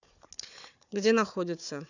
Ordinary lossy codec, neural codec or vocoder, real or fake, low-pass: none; codec, 16 kHz, 4 kbps, FunCodec, trained on Chinese and English, 50 frames a second; fake; 7.2 kHz